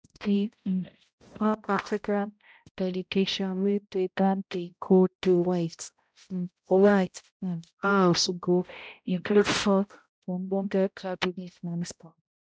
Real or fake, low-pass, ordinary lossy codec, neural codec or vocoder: fake; none; none; codec, 16 kHz, 0.5 kbps, X-Codec, HuBERT features, trained on balanced general audio